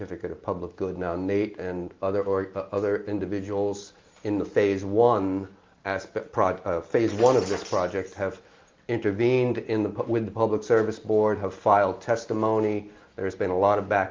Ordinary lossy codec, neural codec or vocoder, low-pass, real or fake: Opus, 32 kbps; none; 7.2 kHz; real